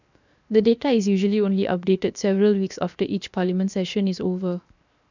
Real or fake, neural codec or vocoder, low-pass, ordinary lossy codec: fake; codec, 16 kHz, 0.7 kbps, FocalCodec; 7.2 kHz; none